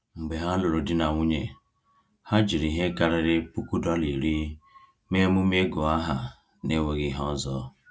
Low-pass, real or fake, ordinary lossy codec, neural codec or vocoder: none; real; none; none